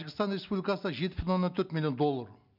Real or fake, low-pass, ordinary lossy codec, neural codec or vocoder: real; 5.4 kHz; none; none